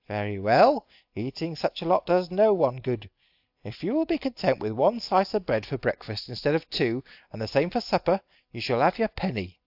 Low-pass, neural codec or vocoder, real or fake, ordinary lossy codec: 5.4 kHz; none; real; AAC, 48 kbps